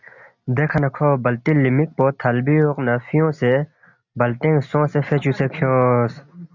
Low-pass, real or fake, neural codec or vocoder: 7.2 kHz; real; none